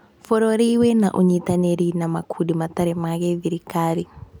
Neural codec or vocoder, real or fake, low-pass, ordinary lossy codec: none; real; none; none